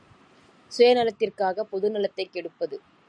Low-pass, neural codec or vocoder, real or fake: 9.9 kHz; none; real